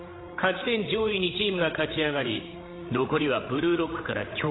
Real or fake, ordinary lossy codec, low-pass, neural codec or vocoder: fake; AAC, 16 kbps; 7.2 kHz; codec, 16 kHz, 8 kbps, FreqCodec, larger model